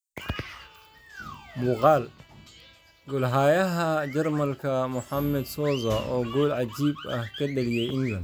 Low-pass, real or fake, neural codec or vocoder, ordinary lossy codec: none; real; none; none